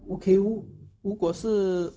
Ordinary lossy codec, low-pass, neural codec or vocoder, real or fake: none; none; codec, 16 kHz, 0.4 kbps, LongCat-Audio-Codec; fake